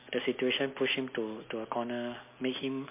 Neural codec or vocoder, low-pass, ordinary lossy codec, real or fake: none; 3.6 kHz; MP3, 24 kbps; real